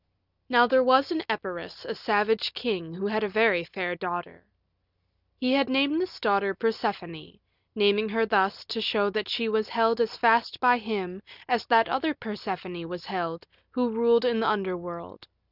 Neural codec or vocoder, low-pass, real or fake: none; 5.4 kHz; real